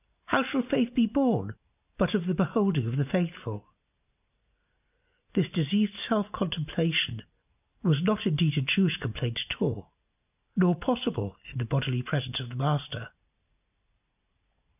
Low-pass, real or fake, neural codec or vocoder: 3.6 kHz; real; none